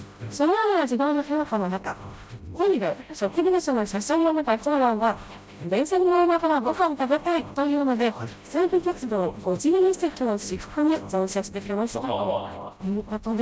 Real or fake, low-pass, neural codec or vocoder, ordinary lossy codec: fake; none; codec, 16 kHz, 0.5 kbps, FreqCodec, smaller model; none